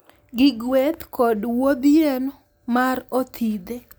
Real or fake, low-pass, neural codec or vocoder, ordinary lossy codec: real; none; none; none